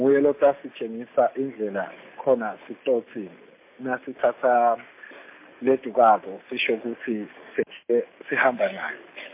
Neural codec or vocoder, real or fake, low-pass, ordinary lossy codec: none; real; 3.6 kHz; MP3, 24 kbps